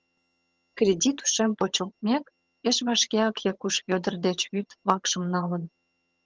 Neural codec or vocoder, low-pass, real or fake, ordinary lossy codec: vocoder, 22.05 kHz, 80 mel bands, HiFi-GAN; 7.2 kHz; fake; Opus, 24 kbps